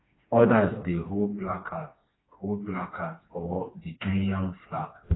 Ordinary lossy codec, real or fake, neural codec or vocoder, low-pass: AAC, 16 kbps; fake; codec, 16 kHz, 2 kbps, FreqCodec, smaller model; 7.2 kHz